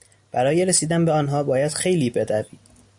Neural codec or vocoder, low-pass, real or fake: none; 10.8 kHz; real